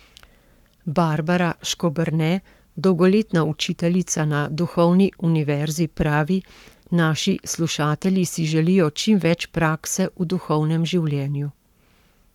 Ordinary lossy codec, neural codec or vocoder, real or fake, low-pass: none; codec, 44.1 kHz, 7.8 kbps, Pupu-Codec; fake; 19.8 kHz